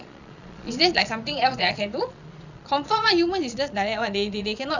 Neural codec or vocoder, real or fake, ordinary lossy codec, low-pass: vocoder, 22.05 kHz, 80 mel bands, Vocos; fake; none; 7.2 kHz